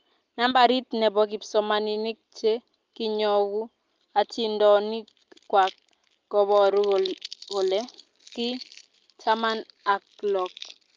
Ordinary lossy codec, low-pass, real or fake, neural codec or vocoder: Opus, 32 kbps; 7.2 kHz; real; none